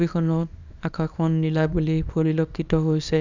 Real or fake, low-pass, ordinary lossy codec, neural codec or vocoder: fake; 7.2 kHz; none; codec, 24 kHz, 0.9 kbps, WavTokenizer, small release